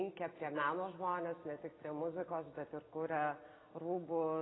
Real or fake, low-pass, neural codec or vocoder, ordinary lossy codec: real; 7.2 kHz; none; AAC, 16 kbps